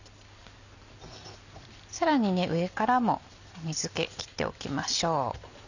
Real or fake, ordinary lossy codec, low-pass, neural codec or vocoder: real; none; 7.2 kHz; none